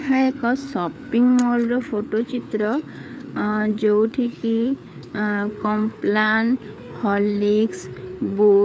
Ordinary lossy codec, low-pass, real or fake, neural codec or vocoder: none; none; fake; codec, 16 kHz, 4 kbps, FreqCodec, larger model